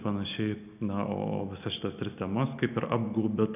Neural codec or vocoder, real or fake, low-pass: none; real; 3.6 kHz